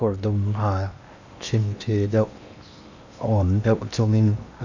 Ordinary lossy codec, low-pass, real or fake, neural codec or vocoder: none; 7.2 kHz; fake; codec, 16 kHz in and 24 kHz out, 0.8 kbps, FocalCodec, streaming, 65536 codes